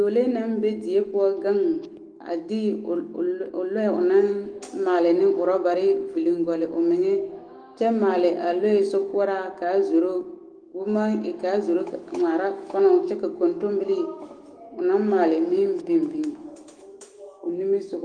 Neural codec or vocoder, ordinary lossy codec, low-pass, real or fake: autoencoder, 48 kHz, 128 numbers a frame, DAC-VAE, trained on Japanese speech; Opus, 24 kbps; 9.9 kHz; fake